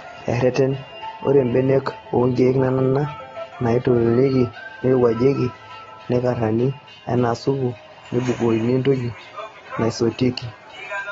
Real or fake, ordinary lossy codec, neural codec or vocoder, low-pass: real; AAC, 24 kbps; none; 7.2 kHz